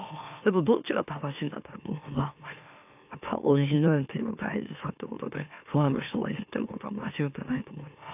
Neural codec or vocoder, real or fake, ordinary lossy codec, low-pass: autoencoder, 44.1 kHz, a latent of 192 numbers a frame, MeloTTS; fake; AAC, 32 kbps; 3.6 kHz